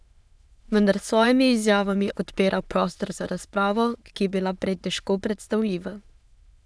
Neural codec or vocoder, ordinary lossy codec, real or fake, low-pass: autoencoder, 22.05 kHz, a latent of 192 numbers a frame, VITS, trained on many speakers; none; fake; none